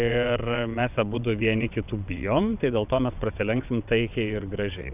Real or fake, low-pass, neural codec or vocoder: fake; 3.6 kHz; vocoder, 22.05 kHz, 80 mel bands, WaveNeXt